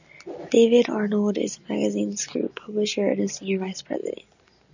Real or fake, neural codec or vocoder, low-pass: real; none; 7.2 kHz